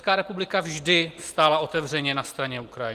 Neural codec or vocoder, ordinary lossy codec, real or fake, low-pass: none; Opus, 24 kbps; real; 14.4 kHz